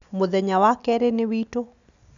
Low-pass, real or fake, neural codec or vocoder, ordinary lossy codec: 7.2 kHz; real; none; none